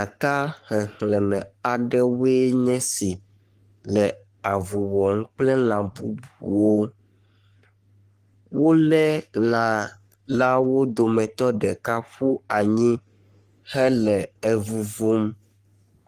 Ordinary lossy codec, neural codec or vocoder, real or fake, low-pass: Opus, 24 kbps; codec, 44.1 kHz, 3.4 kbps, Pupu-Codec; fake; 14.4 kHz